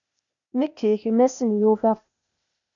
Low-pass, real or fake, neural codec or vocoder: 7.2 kHz; fake; codec, 16 kHz, 0.8 kbps, ZipCodec